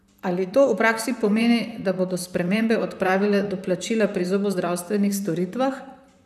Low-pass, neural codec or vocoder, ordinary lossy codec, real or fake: 14.4 kHz; vocoder, 44.1 kHz, 128 mel bands, Pupu-Vocoder; none; fake